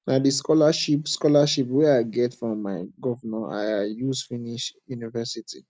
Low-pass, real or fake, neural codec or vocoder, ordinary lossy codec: none; real; none; none